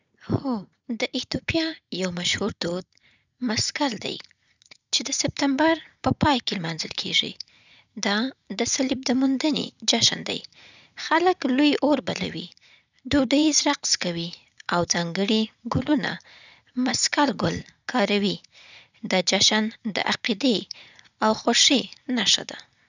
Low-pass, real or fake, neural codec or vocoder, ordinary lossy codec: 7.2 kHz; fake; vocoder, 44.1 kHz, 80 mel bands, Vocos; none